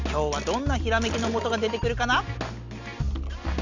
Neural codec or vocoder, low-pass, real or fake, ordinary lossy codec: none; 7.2 kHz; real; Opus, 64 kbps